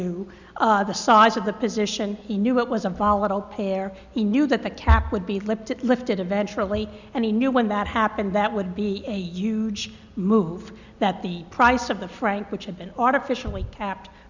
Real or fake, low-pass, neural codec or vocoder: real; 7.2 kHz; none